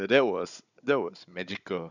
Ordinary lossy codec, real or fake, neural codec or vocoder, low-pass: none; fake; codec, 16 kHz, 16 kbps, FunCodec, trained on Chinese and English, 50 frames a second; 7.2 kHz